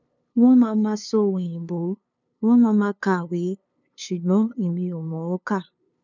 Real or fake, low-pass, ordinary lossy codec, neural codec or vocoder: fake; 7.2 kHz; none; codec, 16 kHz, 2 kbps, FunCodec, trained on LibriTTS, 25 frames a second